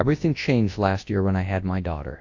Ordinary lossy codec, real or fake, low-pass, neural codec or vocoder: AAC, 48 kbps; fake; 7.2 kHz; codec, 24 kHz, 0.9 kbps, WavTokenizer, large speech release